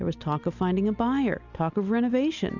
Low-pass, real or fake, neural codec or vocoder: 7.2 kHz; real; none